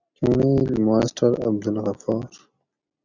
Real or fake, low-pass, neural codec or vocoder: real; 7.2 kHz; none